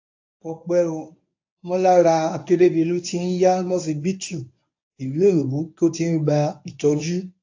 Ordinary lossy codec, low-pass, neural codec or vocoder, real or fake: AAC, 48 kbps; 7.2 kHz; codec, 24 kHz, 0.9 kbps, WavTokenizer, medium speech release version 1; fake